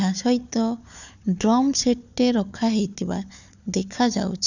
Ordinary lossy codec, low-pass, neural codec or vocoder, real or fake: none; 7.2 kHz; codec, 16 kHz, 4 kbps, FunCodec, trained on Chinese and English, 50 frames a second; fake